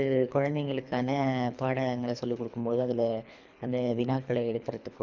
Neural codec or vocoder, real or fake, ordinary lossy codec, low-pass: codec, 24 kHz, 3 kbps, HILCodec; fake; none; 7.2 kHz